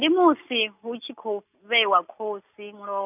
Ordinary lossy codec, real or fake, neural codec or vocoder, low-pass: none; real; none; 3.6 kHz